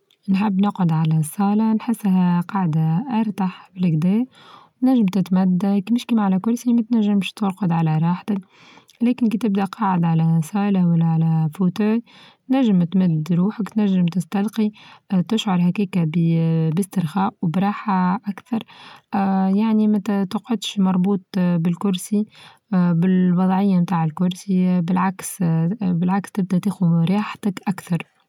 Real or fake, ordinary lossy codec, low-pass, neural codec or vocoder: real; none; 19.8 kHz; none